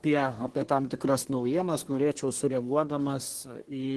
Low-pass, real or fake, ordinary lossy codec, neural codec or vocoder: 10.8 kHz; fake; Opus, 16 kbps; codec, 24 kHz, 1 kbps, SNAC